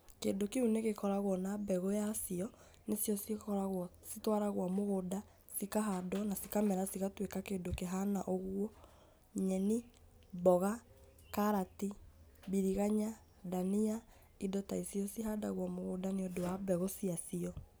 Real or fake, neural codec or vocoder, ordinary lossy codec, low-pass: real; none; none; none